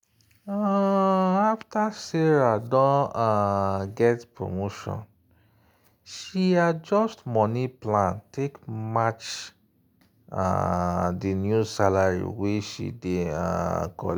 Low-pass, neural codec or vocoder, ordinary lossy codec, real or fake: none; none; none; real